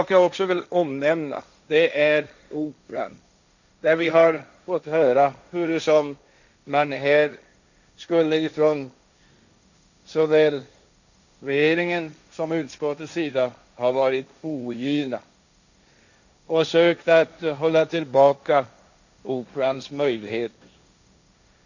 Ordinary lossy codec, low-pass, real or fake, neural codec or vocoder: none; 7.2 kHz; fake; codec, 16 kHz, 1.1 kbps, Voila-Tokenizer